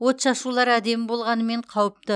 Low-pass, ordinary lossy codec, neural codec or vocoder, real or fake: 9.9 kHz; none; none; real